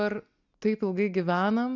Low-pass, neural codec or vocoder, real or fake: 7.2 kHz; none; real